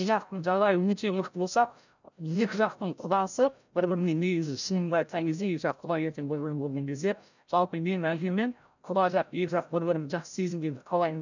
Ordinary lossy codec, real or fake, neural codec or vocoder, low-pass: none; fake; codec, 16 kHz, 0.5 kbps, FreqCodec, larger model; 7.2 kHz